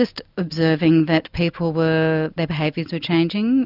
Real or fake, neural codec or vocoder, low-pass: real; none; 5.4 kHz